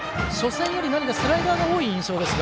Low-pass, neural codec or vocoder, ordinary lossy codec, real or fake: none; none; none; real